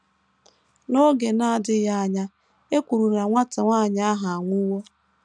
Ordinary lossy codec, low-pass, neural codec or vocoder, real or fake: none; 9.9 kHz; none; real